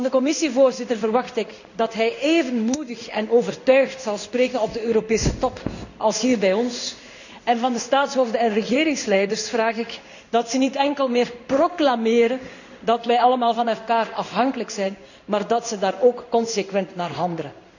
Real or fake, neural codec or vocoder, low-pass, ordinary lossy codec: fake; codec, 16 kHz in and 24 kHz out, 1 kbps, XY-Tokenizer; 7.2 kHz; none